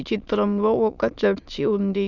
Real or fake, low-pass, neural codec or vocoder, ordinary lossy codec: fake; 7.2 kHz; autoencoder, 22.05 kHz, a latent of 192 numbers a frame, VITS, trained on many speakers; none